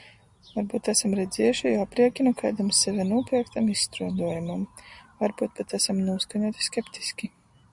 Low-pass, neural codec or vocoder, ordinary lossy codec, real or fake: 10.8 kHz; none; Opus, 64 kbps; real